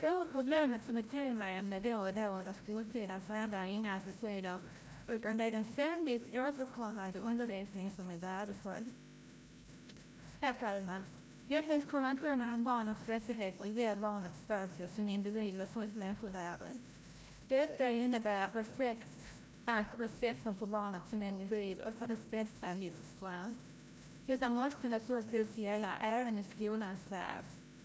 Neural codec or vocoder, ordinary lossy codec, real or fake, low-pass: codec, 16 kHz, 0.5 kbps, FreqCodec, larger model; none; fake; none